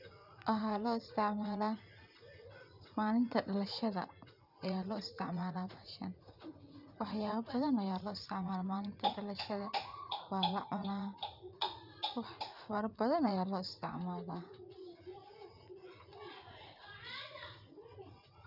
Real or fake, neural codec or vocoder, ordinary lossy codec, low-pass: fake; vocoder, 44.1 kHz, 80 mel bands, Vocos; none; 5.4 kHz